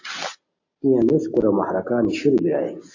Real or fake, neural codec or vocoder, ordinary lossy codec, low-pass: real; none; AAC, 48 kbps; 7.2 kHz